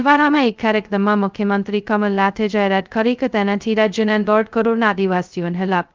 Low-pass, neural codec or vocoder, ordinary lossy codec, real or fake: 7.2 kHz; codec, 16 kHz, 0.2 kbps, FocalCodec; Opus, 32 kbps; fake